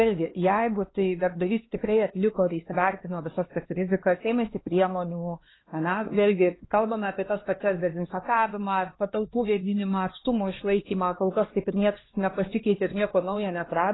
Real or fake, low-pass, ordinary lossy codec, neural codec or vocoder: fake; 7.2 kHz; AAC, 16 kbps; codec, 16 kHz, 2 kbps, X-Codec, HuBERT features, trained on LibriSpeech